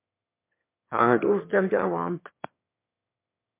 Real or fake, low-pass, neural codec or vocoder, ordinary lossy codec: fake; 3.6 kHz; autoencoder, 22.05 kHz, a latent of 192 numbers a frame, VITS, trained on one speaker; MP3, 32 kbps